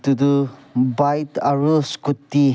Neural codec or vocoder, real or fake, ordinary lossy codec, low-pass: none; real; none; none